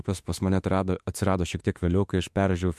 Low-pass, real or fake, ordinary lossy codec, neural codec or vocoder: 14.4 kHz; fake; MP3, 64 kbps; autoencoder, 48 kHz, 32 numbers a frame, DAC-VAE, trained on Japanese speech